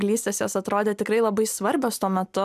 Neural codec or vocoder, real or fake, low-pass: none; real; 14.4 kHz